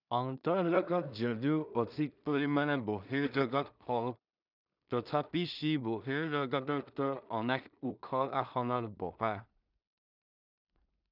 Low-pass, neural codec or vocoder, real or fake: 5.4 kHz; codec, 16 kHz in and 24 kHz out, 0.4 kbps, LongCat-Audio-Codec, two codebook decoder; fake